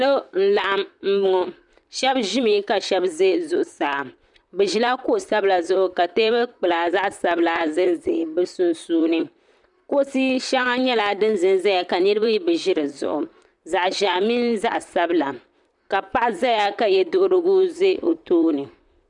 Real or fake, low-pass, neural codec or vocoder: fake; 10.8 kHz; vocoder, 44.1 kHz, 128 mel bands, Pupu-Vocoder